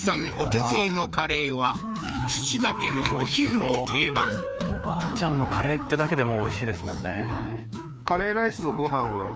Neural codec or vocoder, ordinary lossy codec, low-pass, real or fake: codec, 16 kHz, 2 kbps, FreqCodec, larger model; none; none; fake